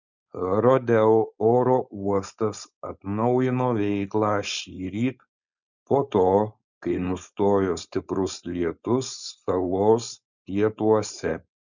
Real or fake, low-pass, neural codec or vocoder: fake; 7.2 kHz; codec, 16 kHz, 4.8 kbps, FACodec